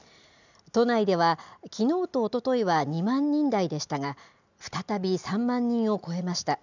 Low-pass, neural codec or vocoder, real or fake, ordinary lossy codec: 7.2 kHz; none; real; none